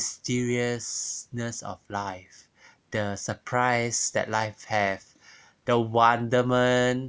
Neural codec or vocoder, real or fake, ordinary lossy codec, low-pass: none; real; none; none